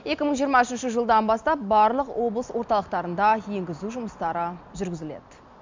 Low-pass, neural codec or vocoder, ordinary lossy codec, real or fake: 7.2 kHz; none; MP3, 64 kbps; real